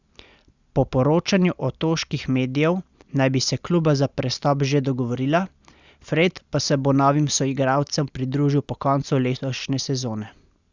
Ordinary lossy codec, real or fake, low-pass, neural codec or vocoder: Opus, 64 kbps; real; 7.2 kHz; none